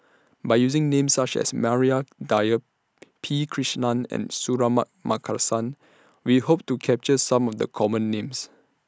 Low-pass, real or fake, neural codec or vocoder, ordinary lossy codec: none; real; none; none